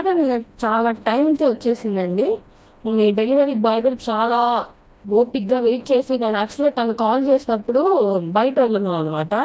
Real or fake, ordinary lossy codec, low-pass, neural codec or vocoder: fake; none; none; codec, 16 kHz, 1 kbps, FreqCodec, smaller model